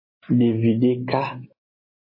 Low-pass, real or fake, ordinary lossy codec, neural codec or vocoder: 5.4 kHz; real; MP3, 24 kbps; none